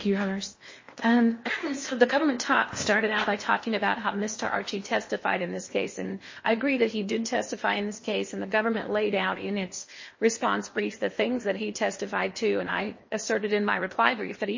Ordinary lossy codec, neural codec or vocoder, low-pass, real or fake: MP3, 32 kbps; codec, 16 kHz in and 24 kHz out, 0.8 kbps, FocalCodec, streaming, 65536 codes; 7.2 kHz; fake